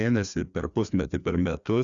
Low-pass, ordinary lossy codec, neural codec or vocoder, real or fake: 7.2 kHz; Opus, 64 kbps; codec, 16 kHz, 2 kbps, FreqCodec, larger model; fake